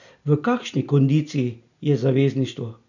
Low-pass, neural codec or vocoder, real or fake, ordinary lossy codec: 7.2 kHz; none; real; none